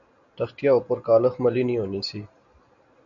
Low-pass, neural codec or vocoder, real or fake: 7.2 kHz; none; real